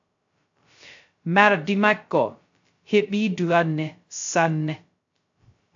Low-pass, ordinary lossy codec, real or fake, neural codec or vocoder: 7.2 kHz; AAC, 64 kbps; fake; codec, 16 kHz, 0.2 kbps, FocalCodec